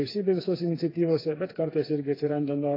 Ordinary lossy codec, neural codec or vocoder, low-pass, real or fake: AAC, 24 kbps; codec, 16 kHz, 4 kbps, FreqCodec, smaller model; 5.4 kHz; fake